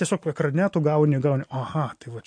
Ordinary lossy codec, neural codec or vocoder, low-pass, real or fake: MP3, 48 kbps; none; 9.9 kHz; real